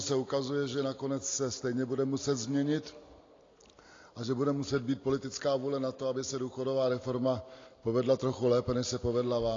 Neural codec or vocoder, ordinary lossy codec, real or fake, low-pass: none; AAC, 32 kbps; real; 7.2 kHz